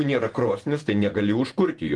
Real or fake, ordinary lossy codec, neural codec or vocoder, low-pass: fake; Opus, 24 kbps; vocoder, 48 kHz, 128 mel bands, Vocos; 10.8 kHz